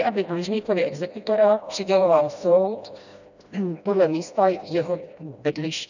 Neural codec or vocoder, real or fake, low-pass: codec, 16 kHz, 1 kbps, FreqCodec, smaller model; fake; 7.2 kHz